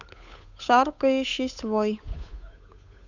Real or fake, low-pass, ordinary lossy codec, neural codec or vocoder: fake; 7.2 kHz; none; codec, 16 kHz, 8 kbps, FunCodec, trained on Chinese and English, 25 frames a second